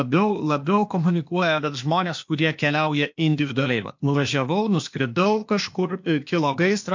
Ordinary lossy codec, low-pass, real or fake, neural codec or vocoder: MP3, 48 kbps; 7.2 kHz; fake; codec, 16 kHz, 0.8 kbps, ZipCodec